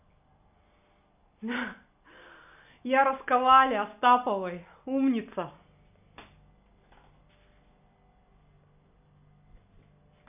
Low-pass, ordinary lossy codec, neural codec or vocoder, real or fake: 3.6 kHz; none; none; real